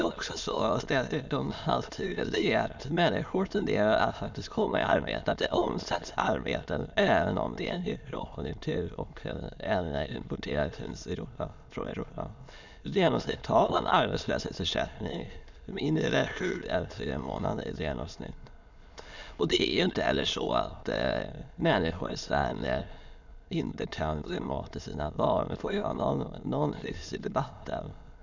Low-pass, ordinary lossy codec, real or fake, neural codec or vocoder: 7.2 kHz; none; fake; autoencoder, 22.05 kHz, a latent of 192 numbers a frame, VITS, trained on many speakers